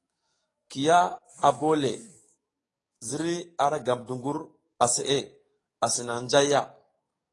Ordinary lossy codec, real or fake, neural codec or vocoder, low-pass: AAC, 32 kbps; fake; codec, 44.1 kHz, 7.8 kbps, DAC; 10.8 kHz